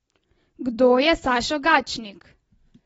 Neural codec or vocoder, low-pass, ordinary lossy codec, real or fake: none; 19.8 kHz; AAC, 24 kbps; real